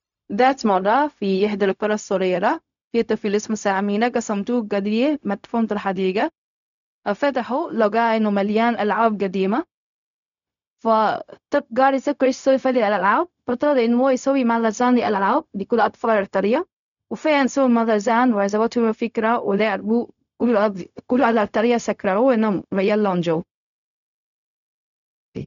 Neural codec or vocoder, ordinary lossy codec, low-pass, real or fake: codec, 16 kHz, 0.4 kbps, LongCat-Audio-Codec; none; 7.2 kHz; fake